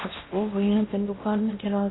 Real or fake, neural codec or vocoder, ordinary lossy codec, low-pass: fake; codec, 16 kHz in and 24 kHz out, 0.8 kbps, FocalCodec, streaming, 65536 codes; AAC, 16 kbps; 7.2 kHz